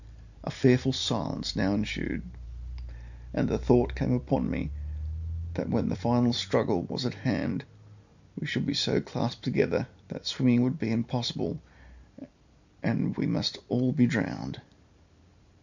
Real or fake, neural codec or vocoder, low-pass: real; none; 7.2 kHz